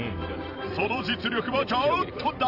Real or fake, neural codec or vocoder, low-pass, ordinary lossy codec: fake; vocoder, 44.1 kHz, 128 mel bands every 512 samples, BigVGAN v2; 5.4 kHz; none